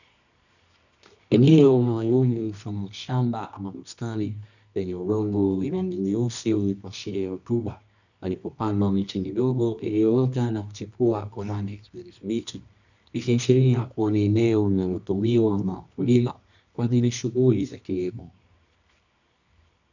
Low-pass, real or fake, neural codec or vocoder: 7.2 kHz; fake; codec, 24 kHz, 0.9 kbps, WavTokenizer, medium music audio release